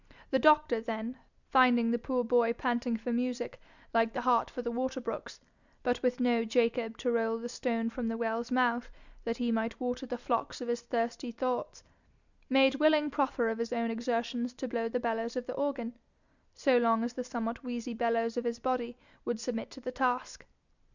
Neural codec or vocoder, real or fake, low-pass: none; real; 7.2 kHz